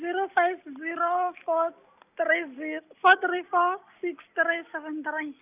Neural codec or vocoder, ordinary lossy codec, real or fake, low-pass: none; none; real; 3.6 kHz